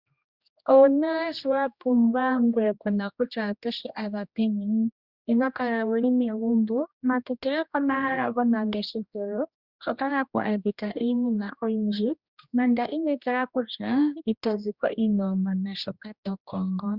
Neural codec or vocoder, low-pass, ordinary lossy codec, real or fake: codec, 16 kHz, 1 kbps, X-Codec, HuBERT features, trained on general audio; 5.4 kHz; Opus, 64 kbps; fake